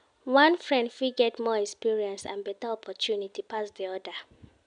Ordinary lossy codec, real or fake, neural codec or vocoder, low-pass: none; real; none; 9.9 kHz